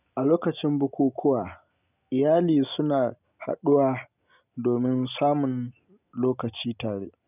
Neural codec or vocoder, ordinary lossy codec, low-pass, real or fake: none; none; 3.6 kHz; real